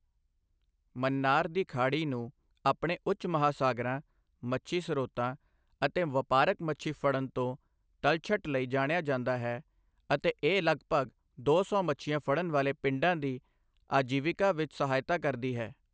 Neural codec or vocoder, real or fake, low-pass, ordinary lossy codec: none; real; none; none